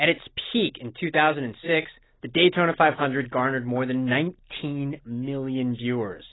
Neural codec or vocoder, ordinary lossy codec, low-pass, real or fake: none; AAC, 16 kbps; 7.2 kHz; real